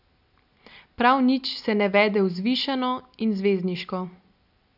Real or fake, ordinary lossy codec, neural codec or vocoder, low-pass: real; none; none; 5.4 kHz